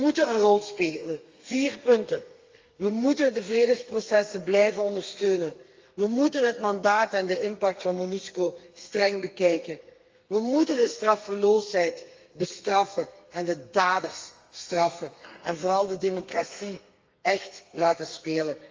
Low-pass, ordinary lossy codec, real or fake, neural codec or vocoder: 7.2 kHz; Opus, 32 kbps; fake; codec, 32 kHz, 1.9 kbps, SNAC